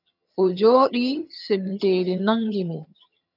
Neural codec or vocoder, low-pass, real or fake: vocoder, 22.05 kHz, 80 mel bands, HiFi-GAN; 5.4 kHz; fake